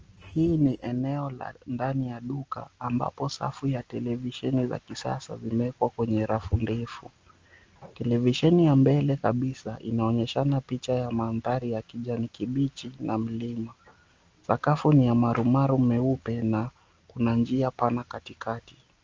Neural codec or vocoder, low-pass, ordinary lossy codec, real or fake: none; 7.2 kHz; Opus, 24 kbps; real